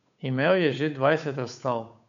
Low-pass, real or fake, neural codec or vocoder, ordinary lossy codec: 7.2 kHz; fake; codec, 16 kHz, 8 kbps, FunCodec, trained on Chinese and English, 25 frames a second; none